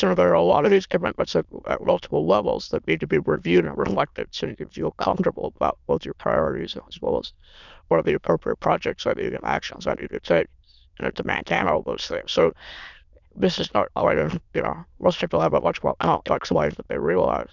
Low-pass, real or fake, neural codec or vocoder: 7.2 kHz; fake; autoencoder, 22.05 kHz, a latent of 192 numbers a frame, VITS, trained on many speakers